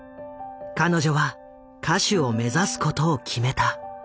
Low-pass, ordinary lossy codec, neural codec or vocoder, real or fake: none; none; none; real